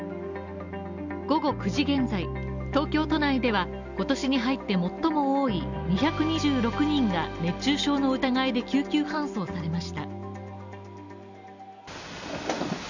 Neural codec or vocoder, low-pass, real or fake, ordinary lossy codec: none; 7.2 kHz; real; none